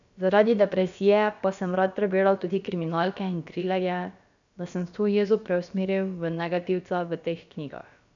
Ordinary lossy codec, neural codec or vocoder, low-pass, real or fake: none; codec, 16 kHz, about 1 kbps, DyCAST, with the encoder's durations; 7.2 kHz; fake